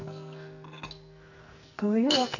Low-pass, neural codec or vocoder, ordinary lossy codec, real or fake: 7.2 kHz; codec, 44.1 kHz, 2.6 kbps, SNAC; none; fake